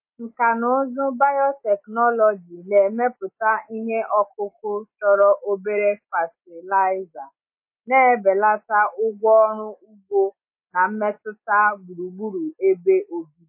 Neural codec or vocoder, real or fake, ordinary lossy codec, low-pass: none; real; MP3, 24 kbps; 3.6 kHz